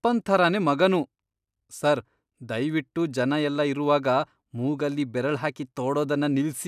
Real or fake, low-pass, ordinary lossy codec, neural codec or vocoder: real; 14.4 kHz; none; none